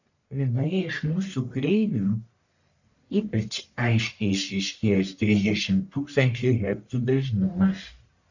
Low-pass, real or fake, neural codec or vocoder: 7.2 kHz; fake; codec, 44.1 kHz, 1.7 kbps, Pupu-Codec